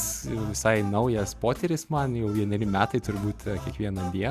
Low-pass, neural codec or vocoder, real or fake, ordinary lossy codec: 14.4 kHz; none; real; Opus, 64 kbps